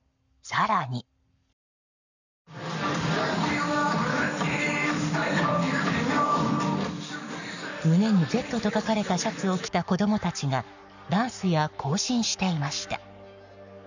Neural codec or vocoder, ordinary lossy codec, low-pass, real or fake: codec, 44.1 kHz, 7.8 kbps, Pupu-Codec; none; 7.2 kHz; fake